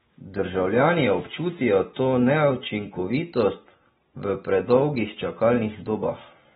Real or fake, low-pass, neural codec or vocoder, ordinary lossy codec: real; 19.8 kHz; none; AAC, 16 kbps